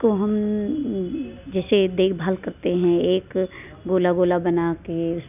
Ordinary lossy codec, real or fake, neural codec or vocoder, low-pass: none; real; none; 3.6 kHz